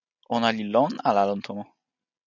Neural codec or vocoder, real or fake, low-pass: none; real; 7.2 kHz